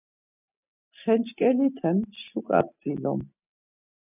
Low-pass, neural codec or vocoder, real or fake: 3.6 kHz; none; real